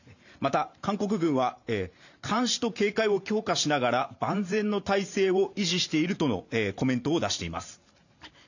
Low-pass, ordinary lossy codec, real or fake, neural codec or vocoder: 7.2 kHz; none; fake; vocoder, 44.1 kHz, 128 mel bands every 512 samples, BigVGAN v2